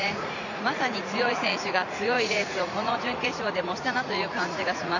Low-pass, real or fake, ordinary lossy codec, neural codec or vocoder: 7.2 kHz; fake; none; vocoder, 44.1 kHz, 80 mel bands, Vocos